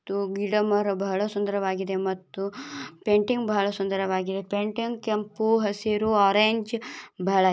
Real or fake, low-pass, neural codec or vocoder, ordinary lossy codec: real; none; none; none